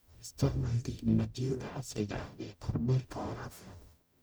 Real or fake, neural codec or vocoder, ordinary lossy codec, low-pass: fake; codec, 44.1 kHz, 0.9 kbps, DAC; none; none